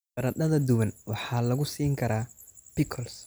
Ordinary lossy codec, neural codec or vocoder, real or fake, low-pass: none; none; real; none